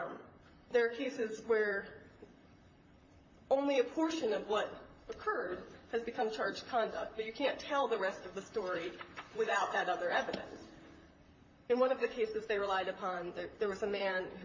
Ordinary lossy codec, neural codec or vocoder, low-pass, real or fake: MP3, 32 kbps; vocoder, 44.1 kHz, 128 mel bands, Pupu-Vocoder; 7.2 kHz; fake